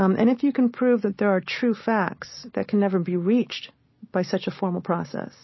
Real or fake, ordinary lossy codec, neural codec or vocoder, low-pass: real; MP3, 24 kbps; none; 7.2 kHz